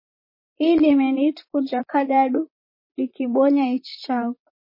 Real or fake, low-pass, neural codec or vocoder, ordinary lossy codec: fake; 5.4 kHz; vocoder, 44.1 kHz, 128 mel bands, Pupu-Vocoder; MP3, 24 kbps